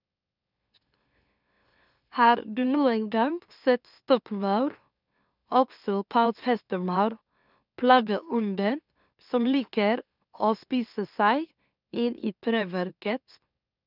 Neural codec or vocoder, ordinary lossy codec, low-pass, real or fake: autoencoder, 44.1 kHz, a latent of 192 numbers a frame, MeloTTS; none; 5.4 kHz; fake